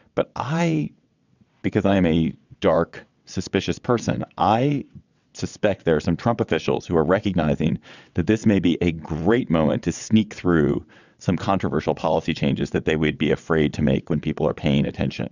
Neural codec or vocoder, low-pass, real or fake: vocoder, 22.05 kHz, 80 mel bands, WaveNeXt; 7.2 kHz; fake